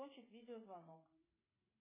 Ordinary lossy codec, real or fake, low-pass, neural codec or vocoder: MP3, 16 kbps; fake; 3.6 kHz; codec, 16 kHz, 8 kbps, FreqCodec, smaller model